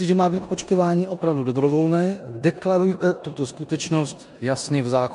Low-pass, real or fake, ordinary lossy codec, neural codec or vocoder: 10.8 kHz; fake; MP3, 64 kbps; codec, 16 kHz in and 24 kHz out, 0.9 kbps, LongCat-Audio-Codec, four codebook decoder